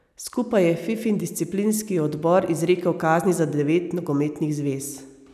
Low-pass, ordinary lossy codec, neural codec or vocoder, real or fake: 14.4 kHz; none; none; real